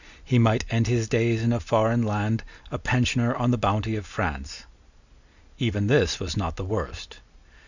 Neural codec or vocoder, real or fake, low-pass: none; real; 7.2 kHz